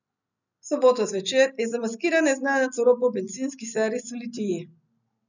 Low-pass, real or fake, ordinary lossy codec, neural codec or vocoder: 7.2 kHz; real; none; none